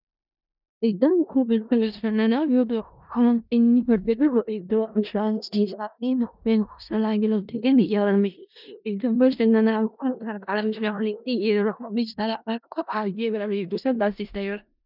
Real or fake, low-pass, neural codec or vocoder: fake; 5.4 kHz; codec, 16 kHz in and 24 kHz out, 0.4 kbps, LongCat-Audio-Codec, four codebook decoder